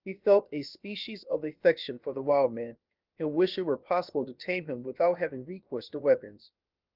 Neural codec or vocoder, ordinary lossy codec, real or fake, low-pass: codec, 16 kHz, about 1 kbps, DyCAST, with the encoder's durations; Opus, 32 kbps; fake; 5.4 kHz